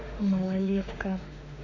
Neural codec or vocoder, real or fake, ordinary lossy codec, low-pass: autoencoder, 48 kHz, 32 numbers a frame, DAC-VAE, trained on Japanese speech; fake; none; 7.2 kHz